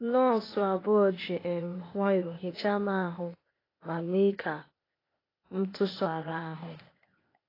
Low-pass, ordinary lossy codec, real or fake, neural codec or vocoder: 5.4 kHz; AAC, 24 kbps; fake; codec, 16 kHz, 0.8 kbps, ZipCodec